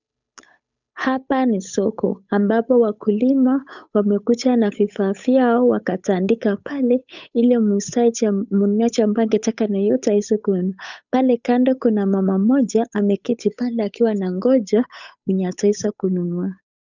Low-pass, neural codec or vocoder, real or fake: 7.2 kHz; codec, 16 kHz, 8 kbps, FunCodec, trained on Chinese and English, 25 frames a second; fake